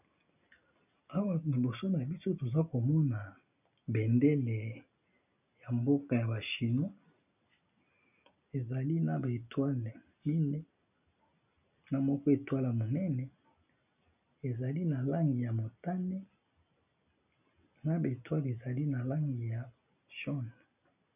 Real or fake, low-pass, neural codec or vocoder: real; 3.6 kHz; none